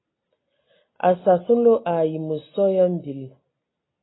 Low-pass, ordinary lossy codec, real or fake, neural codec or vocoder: 7.2 kHz; AAC, 16 kbps; real; none